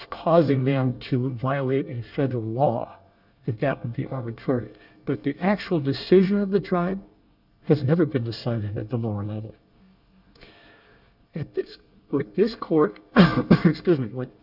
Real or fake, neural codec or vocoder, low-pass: fake; codec, 24 kHz, 1 kbps, SNAC; 5.4 kHz